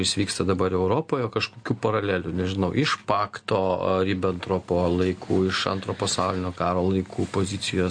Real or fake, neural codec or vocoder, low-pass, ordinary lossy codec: real; none; 9.9 kHz; MP3, 48 kbps